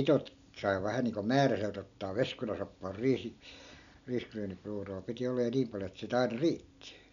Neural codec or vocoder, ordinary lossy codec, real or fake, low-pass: none; none; real; 7.2 kHz